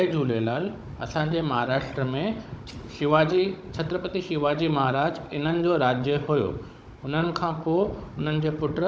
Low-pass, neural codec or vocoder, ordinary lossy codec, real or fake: none; codec, 16 kHz, 16 kbps, FunCodec, trained on Chinese and English, 50 frames a second; none; fake